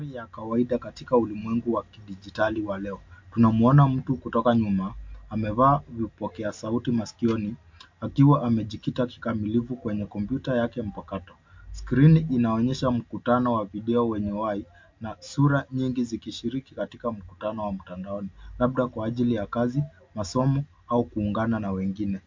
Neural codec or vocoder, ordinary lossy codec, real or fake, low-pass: none; MP3, 48 kbps; real; 7.2 kHz